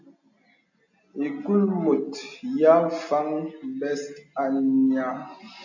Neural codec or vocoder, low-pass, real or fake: none; 7.2 kHz; real